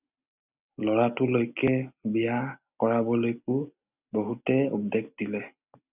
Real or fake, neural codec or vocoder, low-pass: real; none; 3.6 kHz